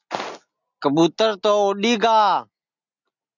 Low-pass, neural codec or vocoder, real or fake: 7.2 kHz; none; real